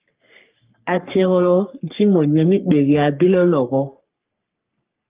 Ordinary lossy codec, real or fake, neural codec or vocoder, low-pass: Opus, 24 kbps; fake; codec, 44.1 kHz, 3.4 kbps, Pupu-Codec; 3.6 kHz